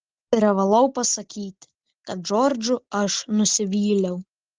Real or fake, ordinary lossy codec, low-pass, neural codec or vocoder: real; Opus, 16 kbps; 7.2 kHz; none